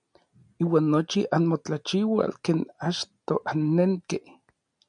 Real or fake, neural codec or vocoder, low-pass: real; none; 9.9 kHz